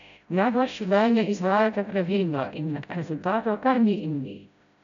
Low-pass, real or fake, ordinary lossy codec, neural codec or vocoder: 7.2 kHz; fake; MP3, 96 kbps; codec, 16 kHz, 0.5 kbps, FreqCodec, smaller model